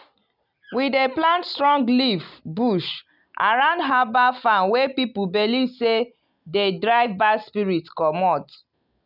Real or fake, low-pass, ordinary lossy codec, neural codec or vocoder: real; 5.4 kHz; none; none